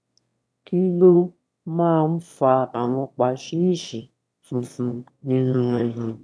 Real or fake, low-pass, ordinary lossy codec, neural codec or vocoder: fake; none; none; autoencoder, 22.05 kHz, a latent of 192 numbers a frame, VITS, trained on one speaker